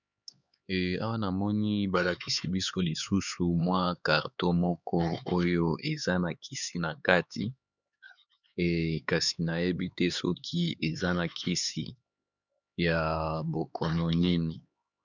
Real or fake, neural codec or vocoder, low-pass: fake; codec, 16 kHz, 4 kbps, X-Codec, HuBERT features, trained on LibriSpeech; 7.2 kHz